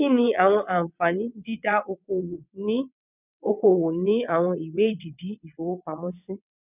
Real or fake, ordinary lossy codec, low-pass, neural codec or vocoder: fake; none; 3.6 kHz; vocoder, 22.05 kHz, 80 mel bands, WaveNeXt